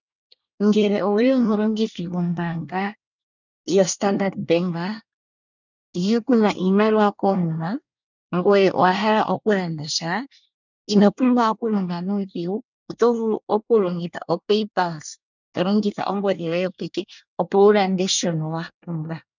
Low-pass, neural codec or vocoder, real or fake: 7.2 kHz; codec, 24 kHz, 1 kbps, SNAC; fake